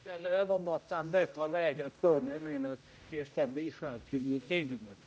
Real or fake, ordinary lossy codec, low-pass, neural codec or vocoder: fake; none; none; codec, 16 kHz, 0.5 kbps, X-Codec, HuBERT features, trained on general audio